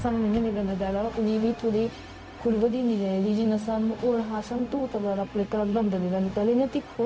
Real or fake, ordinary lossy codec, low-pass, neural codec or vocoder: fake; none; none; codec, 16 kHz, 0.4 kbps, LongCat-Audio-Codec